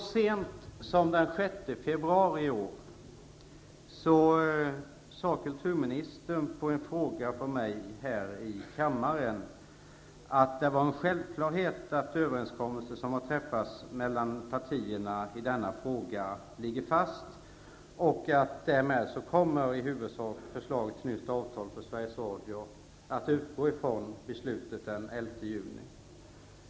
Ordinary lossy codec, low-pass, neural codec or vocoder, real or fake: none; none; none; real